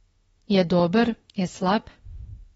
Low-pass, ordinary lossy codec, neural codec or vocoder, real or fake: 19.8 kHz; AAC, 24 kbps; autoencoder, 48 kHz, 32 numbers a frame, DAC-VAE, trained on Japanese speech; fake